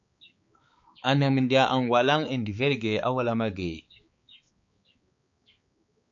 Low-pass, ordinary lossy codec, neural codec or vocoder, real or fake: 7.2 kHz; MP3, 48 kbps; codec, 16 kHz, 4 kbps, X-Codec, HuBERT features, trained on balanced general audio; fake